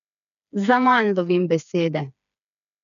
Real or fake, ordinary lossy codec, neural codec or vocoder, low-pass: fake; none; codec, 16 kHz, 4 kbps, FreqCodec, smaller model; 7.2 kHz